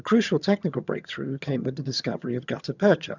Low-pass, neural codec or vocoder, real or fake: 7.2 kHz; vocoder, 22.05 kHz, 80 mel bands, HiFi-GAN; fake